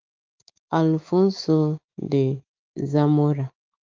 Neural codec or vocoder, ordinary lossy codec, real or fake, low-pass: autoencoder, 48 kHz, 128 numbers a frame, DAC-VAE, trained on Japanese speech; Opus, 32 kbps; fake; 7.2 kHz